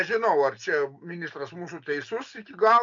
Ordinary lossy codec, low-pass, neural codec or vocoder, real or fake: MP3, 64 kbps; 7.2 kHz; none; real